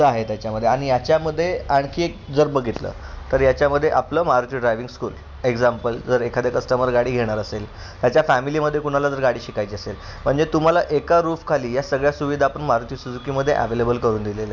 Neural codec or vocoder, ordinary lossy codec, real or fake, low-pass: none; none; real; 7.2 kHz